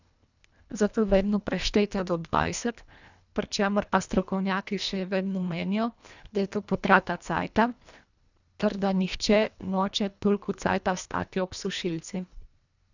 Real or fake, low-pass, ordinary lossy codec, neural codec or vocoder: fake; 7.2 kHz; none; codec, 24 kHz, 1.5 kbps, HILCodec